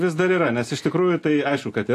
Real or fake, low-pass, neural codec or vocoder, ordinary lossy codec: real; 14.4 kHz; none; AAC, 64 kbps